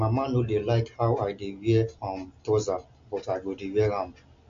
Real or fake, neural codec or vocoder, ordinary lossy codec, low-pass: real; none; MP3, 48 kbps; 7.2 kHz